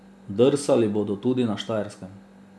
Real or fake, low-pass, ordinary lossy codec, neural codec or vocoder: real; none; none; none